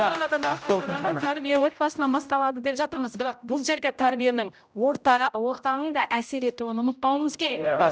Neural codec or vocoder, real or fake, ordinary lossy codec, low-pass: codec, 16 kHz, 0.5 kbps, X-Codec, HuBERT features, trained on general audio; fake; none; none